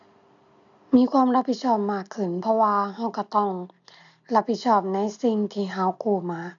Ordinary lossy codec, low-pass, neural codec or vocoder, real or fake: none; 7.2 kHz; none; real